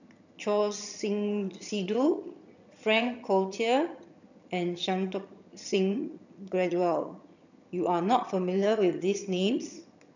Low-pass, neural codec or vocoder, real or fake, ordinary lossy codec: 7.2 kHz; vocoder, 22.05 kHz, 80 mel bands, HiFi-GAN; fake; none